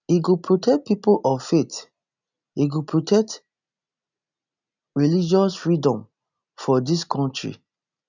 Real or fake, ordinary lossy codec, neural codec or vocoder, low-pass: real; none; none; 7.2 kHz